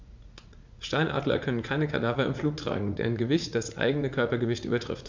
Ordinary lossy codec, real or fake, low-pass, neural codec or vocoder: MP3, 64 kbps; fake; 7.2 kHz; vocoder, 44.1 kHz, 80 mel bands, Vocos